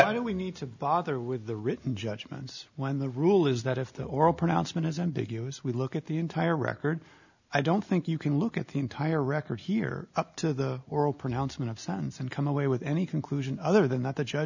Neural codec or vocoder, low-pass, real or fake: none; 7.2 kHz; real